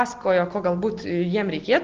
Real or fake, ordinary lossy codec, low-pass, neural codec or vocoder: real; Opus, 16 kbps; 7.2 kHz; none